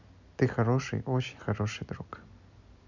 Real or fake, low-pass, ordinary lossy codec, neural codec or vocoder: real; 7.2 kHz; none; none